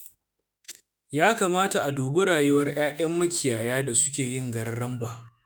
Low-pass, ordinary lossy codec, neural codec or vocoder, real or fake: none; none; autoencoder, 48 kHz, 32 numbers a frame, DAC-VAE, trained on Japanese speech; fake